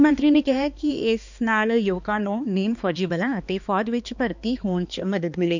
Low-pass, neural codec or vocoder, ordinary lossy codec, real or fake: 7.2 kHz; codec, 16 kHz, 2 kbps, X-Codec, HuBERT features, trained on balanced general audio; none; fake